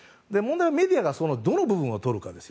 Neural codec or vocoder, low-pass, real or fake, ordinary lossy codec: none; none; real; none